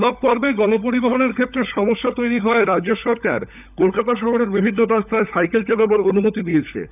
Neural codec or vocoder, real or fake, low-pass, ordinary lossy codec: codec, 16 kHz, 16 kbps, FunCodec, trained on LibriTTS, 50 frames a second; fake; 3.6 kHz; none